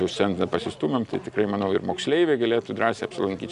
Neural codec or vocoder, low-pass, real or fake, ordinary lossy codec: none; 10.8 kHz; real; AAC, 96 kbps